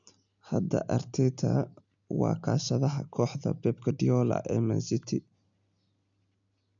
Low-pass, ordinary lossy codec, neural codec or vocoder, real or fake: 7.2 kHz; none; none; real